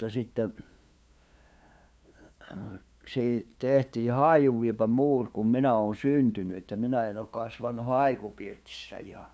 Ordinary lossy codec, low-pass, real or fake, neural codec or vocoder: none; none; fake; codec, 16 kHz, 2 kbps, FunCodec, trained on LibriTTS, 25 frames a second